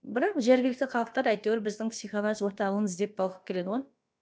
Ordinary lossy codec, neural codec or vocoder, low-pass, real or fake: none; codec, 16 kHz, about 1 kbps, DyCAST, with the encoder's durations; none; fake